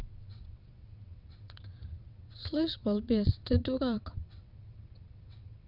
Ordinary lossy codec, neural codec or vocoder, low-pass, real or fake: none; vocoder, 22.05 kHz, 80 mel bands, WaveNeXt; 5.4 kHz; fake